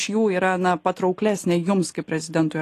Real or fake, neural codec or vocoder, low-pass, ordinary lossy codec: real; none; 14.4 kHz; AAC, 48 kbps